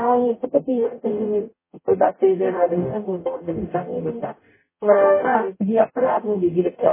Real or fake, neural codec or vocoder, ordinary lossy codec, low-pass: fake; codec, 44.1 kHz, 0.9 kbps, DAC; MP3, 16 kbps; 3.6 kHz